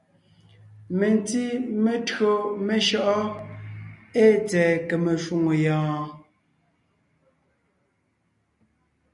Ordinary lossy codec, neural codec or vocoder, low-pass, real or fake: MP3, 96 kbps; none; 10.8 kHz; real